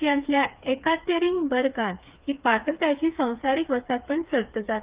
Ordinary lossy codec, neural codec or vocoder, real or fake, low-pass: Opus, 32 kbps; codec, 16 kHz, 4 kbps, FreqCodec, smaller model; fake; 3.6 kHz